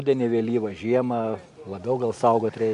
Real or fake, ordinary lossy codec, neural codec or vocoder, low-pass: real; MP3, 64 kbps; none; 10.8 kHz